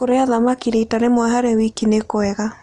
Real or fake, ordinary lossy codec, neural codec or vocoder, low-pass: real; Opus, 24 kbps; none; 10.8 kHz